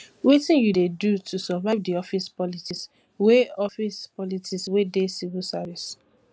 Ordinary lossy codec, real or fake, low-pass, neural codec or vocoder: none; real; none; none